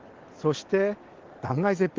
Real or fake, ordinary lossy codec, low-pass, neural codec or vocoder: real; Opus, 16 kbps; 7.2 kHz; none